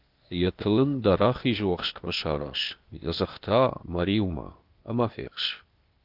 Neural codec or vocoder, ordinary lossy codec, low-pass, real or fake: codec, 16 kHz, 0.8 kbps, ZipCodec; Opus, 32 kbps; 5.4 kHz; fake